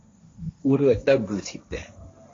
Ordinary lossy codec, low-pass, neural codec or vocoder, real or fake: AAC, 32 kbps; 7.2 kHz; codec, 16 kHz, 1.1 kbps, Voila-Tokenizer; fake